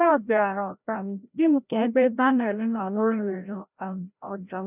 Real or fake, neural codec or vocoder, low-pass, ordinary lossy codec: fake; codec, 16 kHz, 0.5 kbps, FreqCodec, larger model; 3.6 kHz; none